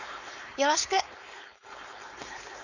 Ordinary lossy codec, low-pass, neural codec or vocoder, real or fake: none; 7.2 kHz; codec, 16 kHz, 4.8 kbps, FACodec; fake